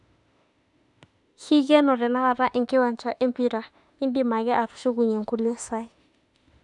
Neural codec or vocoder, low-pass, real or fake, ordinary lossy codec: autoencoder, 48 kHz, 32 numbers a frame, DAC-VAE, trained on Japanese speech; 10.8 kHz; fake; none